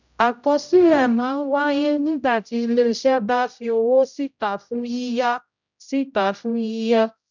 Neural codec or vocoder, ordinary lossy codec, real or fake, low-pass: codec, 16 kHz, 0.5 kbps, X-Codec, HuBERT features, trained on general audio; none; fake; 7.2 kHz